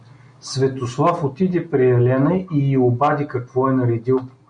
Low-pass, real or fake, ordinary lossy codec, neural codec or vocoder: 9.9 kHz; real; MP3, 96 kbps; none